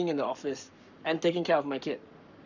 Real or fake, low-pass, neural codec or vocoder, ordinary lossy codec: fake; 7.2 kHz; codec, 44.1 kHz, 7.8 kbps, Pupu-Codec; none